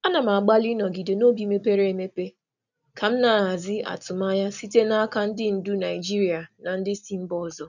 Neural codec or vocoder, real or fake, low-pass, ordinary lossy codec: none; real; 7.2 kHz; none